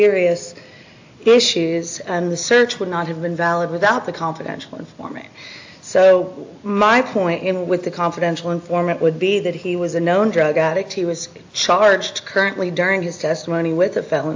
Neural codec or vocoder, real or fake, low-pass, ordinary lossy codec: none; real; 7.2 kHz; AAC, 48 kbps